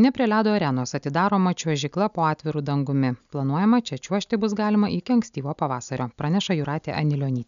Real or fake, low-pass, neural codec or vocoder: real; 7.2 kHz; none